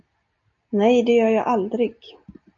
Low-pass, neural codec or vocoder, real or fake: 7.2 kHz; none; real